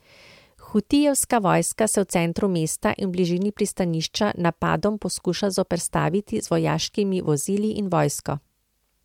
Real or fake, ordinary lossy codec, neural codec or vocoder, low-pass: real; MP3, 96 kbps; none; 19.8 kHz